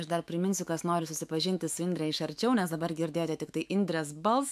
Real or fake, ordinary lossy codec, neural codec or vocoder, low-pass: fake; AAC, 96 kbps; autoencoder, 48 kHz, 128 numbers a frame, DAC-VAE, trained on Japanese speech; 14.4 kHz